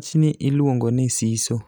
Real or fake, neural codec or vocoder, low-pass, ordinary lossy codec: real; none; none; none